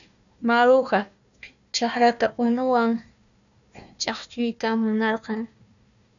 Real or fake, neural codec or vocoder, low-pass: fake; codec, 16 kHz, 1 kbps, FunCodec, trained on Chinese and English, 50 frames a second; 7.2 kHz